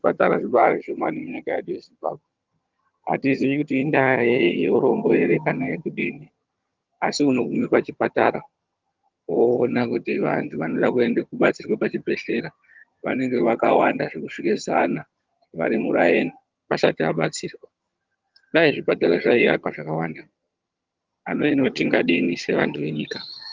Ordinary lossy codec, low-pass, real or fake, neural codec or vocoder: Opus, 32 kbps; 7.2 kHz; fake; vocoder, 22.05 kHz, 80 mel bands, HiFi-GAN